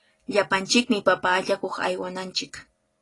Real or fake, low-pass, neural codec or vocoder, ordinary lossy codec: real; 10.8 kHz; none; AAC, 32 kbps